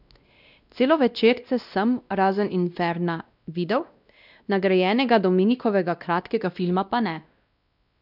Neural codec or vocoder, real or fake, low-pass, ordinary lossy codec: codec, 16 kHz, 1 kbps, X-Codec, WavLM features, trained on Multilingual LibriSpeech; fake; 5.4 kHz; none